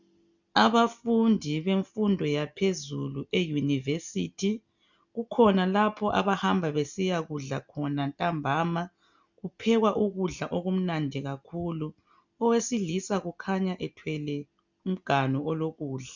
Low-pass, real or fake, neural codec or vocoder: 7.2 kHz; real; none